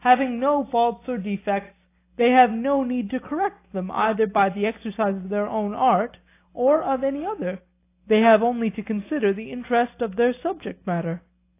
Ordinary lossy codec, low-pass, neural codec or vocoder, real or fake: AAC, 24 kbps; 3.6 kHz; none; real